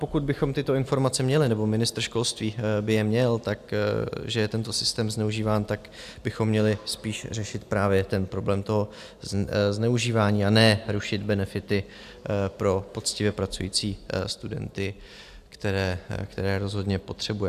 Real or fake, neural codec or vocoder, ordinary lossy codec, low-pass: real; none; AAC, 96 kbps; 14.4 kHz